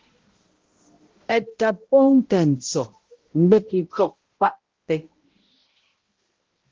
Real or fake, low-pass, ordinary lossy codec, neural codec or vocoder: fake; 7.2 kHz; Opus, 16 kbps; codec, 16 kHz, 0.5 kbps, X-Codec, HuBERT features, trained on balanced general audio